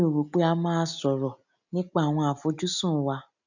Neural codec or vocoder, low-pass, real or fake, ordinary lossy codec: none; 7.2 kHz; real; none